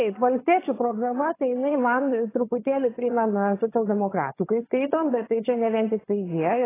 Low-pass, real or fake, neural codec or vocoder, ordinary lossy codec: 3.6 kHz; fake; vocoder, 22.05 kHz, 80 mel bands, HiFi-GAN; AAC, 16 kbps